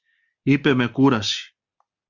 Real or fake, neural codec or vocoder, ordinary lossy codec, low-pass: real; none; Opus, 64 kbps; 7.2 kHz